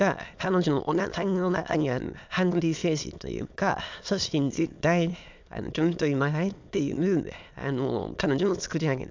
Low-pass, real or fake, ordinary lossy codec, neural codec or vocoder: 7.2 kHz; fake; MP3, 64 kbps; autoencoder, 22.05 kHz, a latent of 192 numbers a frame, VITS, trained on many speakers